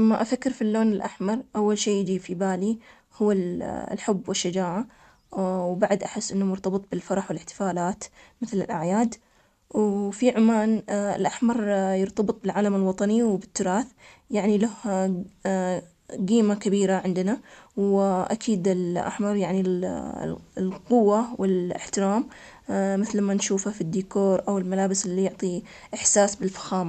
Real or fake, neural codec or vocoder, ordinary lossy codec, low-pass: real; none; none; 14.4 kHz